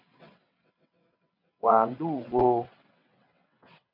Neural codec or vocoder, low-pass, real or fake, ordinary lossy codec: vocoder, 22.05 kHz, 80 mel bands, WaveNeXt; 5.4 kHz; fake; MP3, 48 kbps